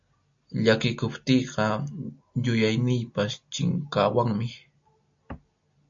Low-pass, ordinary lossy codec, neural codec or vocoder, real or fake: 7.2 kHz; MP3, 48 kbps; none; real